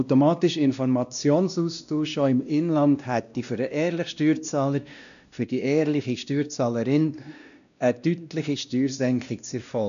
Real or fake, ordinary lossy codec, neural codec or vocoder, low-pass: fake; none; codec, 16 kHz, 1 kbps, X-Codec, WavLM features, trained on Multilingual LibriSpeech; 7.2 kHz